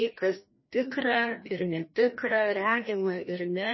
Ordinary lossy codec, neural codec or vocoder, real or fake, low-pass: MP3, 24 kbps; codec, 16 kHz, 1 kbps, FreqCodec, larger model; fake; 7.2 kHz